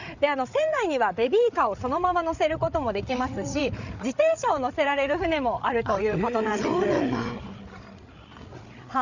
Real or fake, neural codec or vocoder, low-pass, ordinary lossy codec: fake; codec, 16 kHz, 8 kbps, FreqCodec, larger model; 7.2 kHz; none